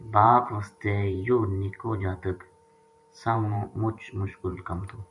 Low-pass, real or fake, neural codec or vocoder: 10.8 kHz; fake; vocoder, 44.1 kHz, 128 mel bands every 512 samples, BigVGAN v2